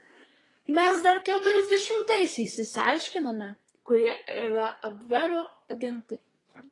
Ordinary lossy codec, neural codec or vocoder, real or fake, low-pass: AAC, 32 kbps; codec, 24 kHz, 1 kbps, SNAC; fake; 10.8 kHz